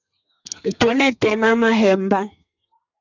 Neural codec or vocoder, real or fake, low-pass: codec, 32 kHz, 1.9 kbps, SNAC; fake; 7.2 kHz